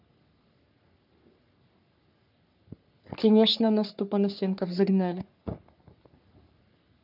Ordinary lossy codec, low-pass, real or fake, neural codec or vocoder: none; 5.4 kHz; fake; codec, 44.1 kHz, 3.4 kbps, Pupu-Codec